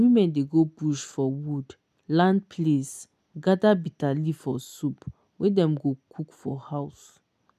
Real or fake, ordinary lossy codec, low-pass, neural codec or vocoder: real; none; 14.4 kHz; none